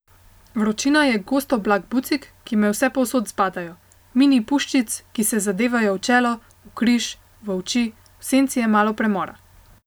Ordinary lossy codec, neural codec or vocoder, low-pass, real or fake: none; none; none; real